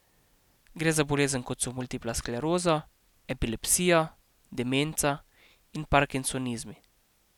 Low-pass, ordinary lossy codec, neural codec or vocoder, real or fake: 19.8 kHz; none; none; real